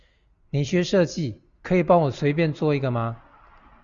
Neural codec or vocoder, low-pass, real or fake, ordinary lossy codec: none; 7.2 kHz; real; Opus, 64 kbps